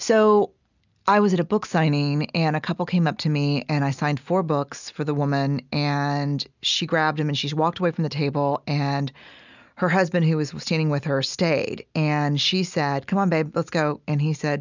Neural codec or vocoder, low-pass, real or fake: none; 7.2 kHz; real